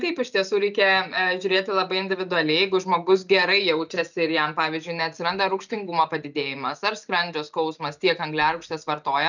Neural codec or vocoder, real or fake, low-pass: none; real; 7.2 kHz